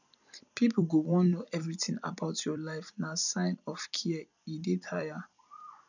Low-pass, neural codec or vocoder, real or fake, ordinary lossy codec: 7.2 kHz; vocoder, 44.1 kHz, 128 mel bands every 256 samples, BigVGAN v2; fake; none